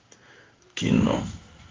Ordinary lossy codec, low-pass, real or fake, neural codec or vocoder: Opus, 24 kbps; 7.2 kHz; fake; codec, 16 kHz in and 24 kHz out, 1 kbps, XY-Tokenizer